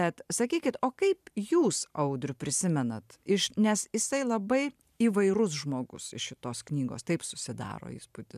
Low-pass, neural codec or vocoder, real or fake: 14.4 kHz; vocoder, 44.1 kHz, 128 mel bands every 512 samples, BigVGAN v2; fake